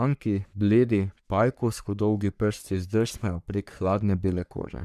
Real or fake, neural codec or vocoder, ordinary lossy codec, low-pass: fake; codec, 44.1 kHz, 3.4 kbps, Pupu-Codec; none; 14.4 kHz